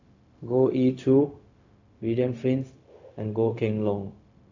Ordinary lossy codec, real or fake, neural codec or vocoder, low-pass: AAC, 48 kbps; fake; codec, 16 kHz, 0.4 kbps, LongCat-Audio-Codec; 7.2 kHz